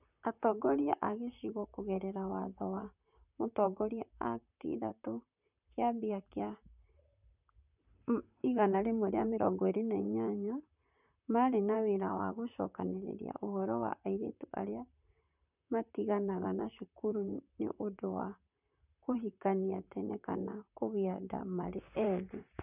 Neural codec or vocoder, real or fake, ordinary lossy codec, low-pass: vocoder, 44.1 kHz, 128 mel bands, Pupu-Vocoder; fake; none; 3.6 kHz